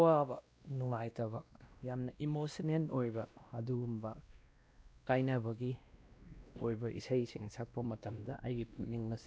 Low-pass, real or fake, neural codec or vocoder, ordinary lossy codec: none; fake; codec, 16 kHz, 1 kbps, X-Codec, WavLM features, trained on Multilingual LibriSpeech; none